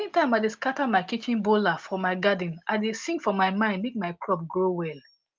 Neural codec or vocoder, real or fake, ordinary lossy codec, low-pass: none; real; Opus, 24 kbps; 7.2 kHz